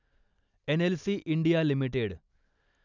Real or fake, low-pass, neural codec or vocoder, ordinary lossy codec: real; 7.2 kHz; none; none